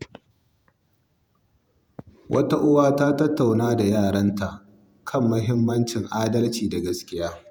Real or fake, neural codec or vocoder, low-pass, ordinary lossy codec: fake; vocoder, 48 kHz, 128 mel bands, Vocos; none; none